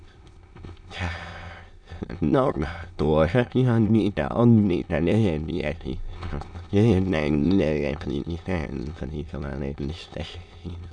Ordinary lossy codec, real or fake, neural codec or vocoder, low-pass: none; fake; autoencoder, 22.05 kHz, a latent of 192 numbers a frame, VITS, trained on many speakers; 9.9 kHz